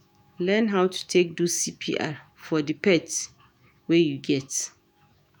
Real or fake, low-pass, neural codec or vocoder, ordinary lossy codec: fake; none; autoencoder, 48 kHz, 128 numbers a frame, DAC-VAE, trained on Japanese speech; none